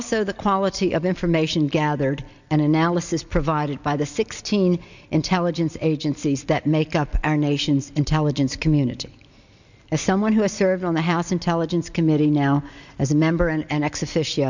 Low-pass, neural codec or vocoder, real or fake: 7.2 kHz; none; real